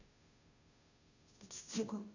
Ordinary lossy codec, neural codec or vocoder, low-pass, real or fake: none; codec, 16 kHz, 0.5 kbps, FunCodec, trained on Chinese and English, 25 frames a second; 7.2 kHz; fake